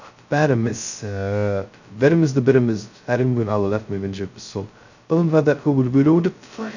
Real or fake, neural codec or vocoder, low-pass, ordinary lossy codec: fake; codec, 16 kHz, 0.2 kbps, FocalCodec; 7.2 kHz; none